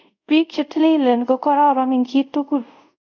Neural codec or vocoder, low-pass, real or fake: codec, 24 kHz, 0.5 kbps, DualCodec; 7.2 kHz; fake